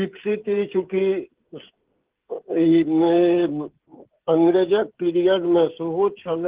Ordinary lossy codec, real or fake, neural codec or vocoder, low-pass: Opus, 16 kbps; fake; codec, 16 kHz, 16 kbps, FreqCodec, smaller model; 3.6 kHz